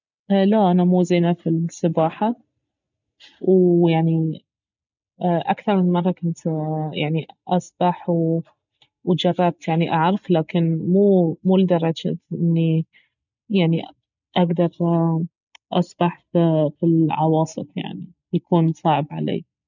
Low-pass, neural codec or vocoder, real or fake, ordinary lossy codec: 7.2 kHz; none; real; none